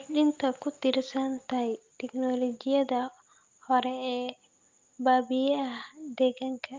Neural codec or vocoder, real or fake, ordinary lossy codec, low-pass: none; real; Opus, 32 kbps; 7.2 kHz